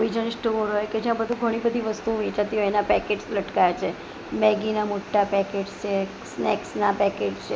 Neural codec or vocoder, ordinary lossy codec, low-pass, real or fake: none; none; none; real